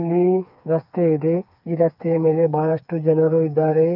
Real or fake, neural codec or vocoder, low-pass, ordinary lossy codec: fake; codec, 16 kHz, 4 kbps, FreqCodec, smaller model; 5.4 kHz; none